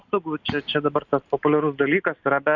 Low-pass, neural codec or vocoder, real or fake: 7.2 kHz; none; real